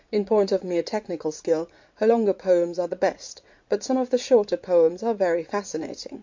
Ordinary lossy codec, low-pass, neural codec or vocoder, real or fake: MP3, 48 kbps; 7.2 kHz; vocoder, 44.1 kHz, 80 mel bands, Vocos; fake